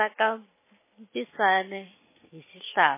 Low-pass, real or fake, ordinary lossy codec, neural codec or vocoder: 3.6 kHz; real; MP3, 16 kbps; none